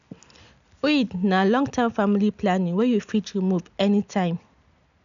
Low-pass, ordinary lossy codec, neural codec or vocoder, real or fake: 7.2 kHz; none; none; real